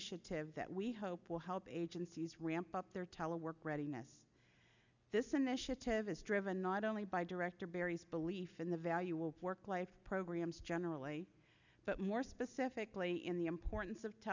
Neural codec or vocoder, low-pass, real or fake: none; 7.2 kHz; real